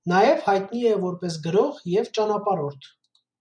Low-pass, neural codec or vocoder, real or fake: 9.9 kHz; none; real